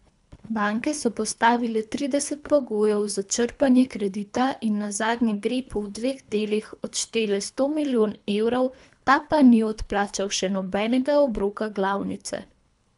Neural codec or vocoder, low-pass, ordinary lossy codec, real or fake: codec, 24 kHz, 3 kbps, HILCodec; 10.8 kHz; none; fake